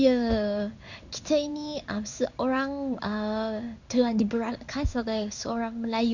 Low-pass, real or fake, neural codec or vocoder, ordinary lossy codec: 7.2 kHz; fake; codec, 16 kHz in and 24 kHz out, 1 kbps, XY-Tokenizer; none